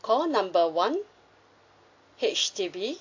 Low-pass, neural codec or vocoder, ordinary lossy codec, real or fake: 7.2 kHz; none; AAC, 48 kbps; real